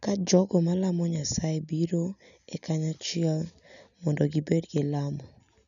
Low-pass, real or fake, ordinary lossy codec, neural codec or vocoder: 7.2 kHz; real; none; none